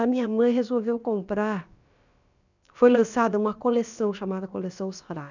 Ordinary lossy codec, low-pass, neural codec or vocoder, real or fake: none; 7.2 kHz; codec, 16 kHz, about 1 kbps, DyCAST, with the encoder's durations; fake